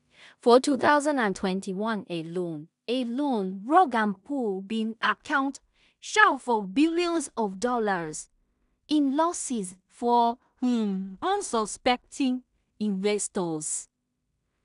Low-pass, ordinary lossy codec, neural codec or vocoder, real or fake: 10.8 kHz; none; codec, 16 kHz in and 24 kHz out, 0.4 kbps, LongCat-Audio-Codec, two codebook decoder; fake